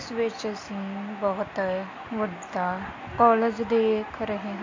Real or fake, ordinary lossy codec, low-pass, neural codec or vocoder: real; AAC, 48 kbps; 7.2 kHz; none